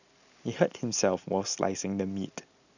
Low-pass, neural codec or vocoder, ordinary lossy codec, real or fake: 7.2 kHz; none; none; real